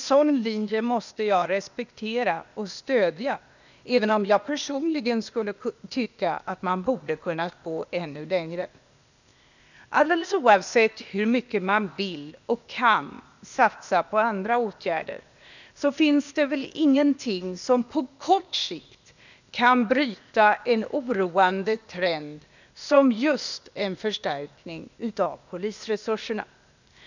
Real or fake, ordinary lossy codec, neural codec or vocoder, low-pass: fake; none; codec, 16 kHz, 0.8 kbps, ZipCodec; 7.2 kHz